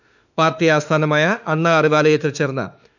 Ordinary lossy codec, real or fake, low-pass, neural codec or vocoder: none; fake; 7.2 kHz; autoencoder, 48 kHz, 32 numbers a frame, DAC-VAE, trained on Japanese speech